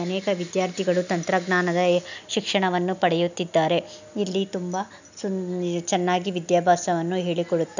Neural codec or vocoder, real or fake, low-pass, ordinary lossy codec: none; real; 7.2 kHz; none